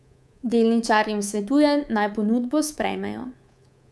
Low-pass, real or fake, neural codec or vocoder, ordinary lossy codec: none; fake; codec, 24 kHz, 3.1 kbps, DualCodec; none